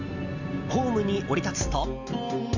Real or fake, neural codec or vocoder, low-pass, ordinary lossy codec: real; none; 7.2 kHz; none